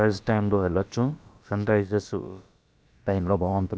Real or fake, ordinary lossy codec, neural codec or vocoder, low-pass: fake; none; codec, 16 kHz, about 1 kbps, DyCAST, with the encoder's durations; none